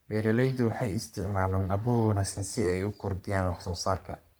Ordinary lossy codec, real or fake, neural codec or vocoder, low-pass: none; fake; codec, 44.1 kHz, 3.4 kbps, Pupu-Codec; none